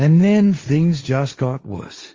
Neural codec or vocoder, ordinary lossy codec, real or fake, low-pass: codec, 16 kHz, 1.1 kbps, Voila-Tokenizer; Opus, 32 kbps; fake; 7.2 kHz